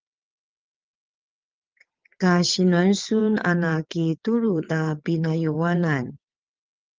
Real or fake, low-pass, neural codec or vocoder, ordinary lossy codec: fake; 7.2 kHz; vocoder, 22.05 kHz, 80 mel bands, WaveNeXt; Opus, 32 kbps